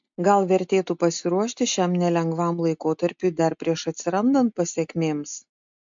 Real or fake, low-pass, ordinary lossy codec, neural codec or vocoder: real; 7.2 kHz; MP3, 64 kbps; none